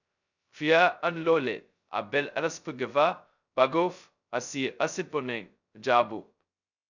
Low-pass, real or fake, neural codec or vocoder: 7.2 kHz; fake; codec, 16 kHz, 0.2 kbps, FocalCodec